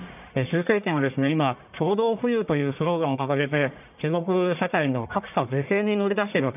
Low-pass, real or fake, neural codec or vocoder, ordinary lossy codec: 3.6 kHz; fake; codec, 44.1 kHz, 1.7 kbps, Pupu-Codec; none